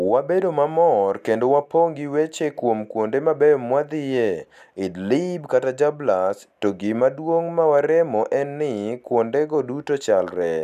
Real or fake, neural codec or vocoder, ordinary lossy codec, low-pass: real; none; none; 14.4 kHz